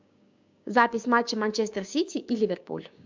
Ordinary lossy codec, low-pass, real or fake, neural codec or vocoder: MP3, 64 kbps; 7.2 kHz; fake; codec, 16 kHz, 6 kbps, DAC